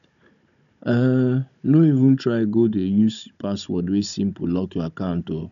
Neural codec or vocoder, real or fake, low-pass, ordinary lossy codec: codec, 16 kHz, 16 kbps, FunCodec, trained on Chinese and English, 50 frames a second; fake; 7.2 kHz; none